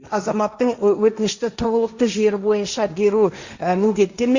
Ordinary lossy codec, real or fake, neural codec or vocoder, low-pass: Opus, 64 kbps; fake; codec, 16 kHz, 1.1 kbps, Voila-Tokenizer; 7.2 kHz